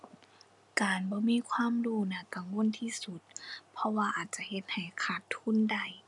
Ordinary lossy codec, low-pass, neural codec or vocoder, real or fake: none; 10.8 kHz; none; real